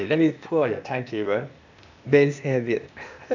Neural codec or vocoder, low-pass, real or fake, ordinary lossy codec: codec, 16 kHz, 0.8 kbps, ZipCodec; 7.2 kHz; fake; none